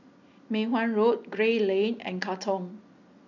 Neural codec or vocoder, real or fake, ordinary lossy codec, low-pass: none; real; none; 7.2 kHz